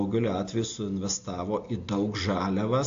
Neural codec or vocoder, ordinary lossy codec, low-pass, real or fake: none; AAC, 48 kbps; 7.2 kHz; real